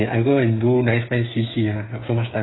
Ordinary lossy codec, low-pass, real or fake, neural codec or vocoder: AAC, 16 kbps; 7.2 kHz; fake; codec, 16 kHz, 8 kbps, FreqCodec, smaller model